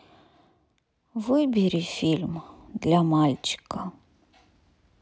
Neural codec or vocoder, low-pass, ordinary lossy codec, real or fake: none; none; none; real